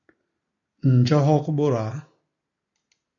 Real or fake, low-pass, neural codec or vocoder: real; 7.2 kHz; none